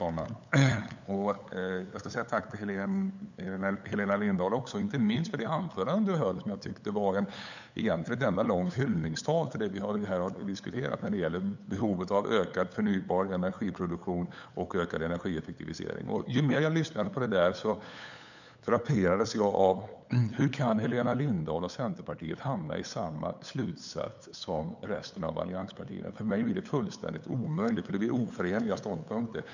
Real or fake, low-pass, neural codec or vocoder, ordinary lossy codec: fake; 7.2 kHz; codec, 16 kHz, 8 kbps, FunCodec, trained on LibriTTS, 25 frames a second; none